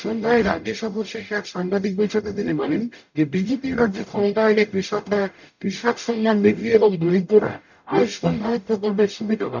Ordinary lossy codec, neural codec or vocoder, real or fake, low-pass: none; codec, 44.1 kHz, 0.9 kbps, DAC; fake; 7.2 kHz